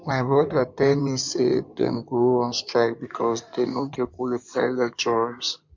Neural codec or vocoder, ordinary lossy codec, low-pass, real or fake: codec, 16 kHz in and 24 kHz out, 2.2 kbps, FireRedTTS-2 codec; MP3, 64 kbps; 7.2 kHz; fake